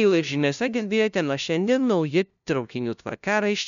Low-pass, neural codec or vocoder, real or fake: 7.2 kHz; codec, 16 kHz, 0.5 kbps, FunCodec, trained on LibriTTS, 25 frames a second; fake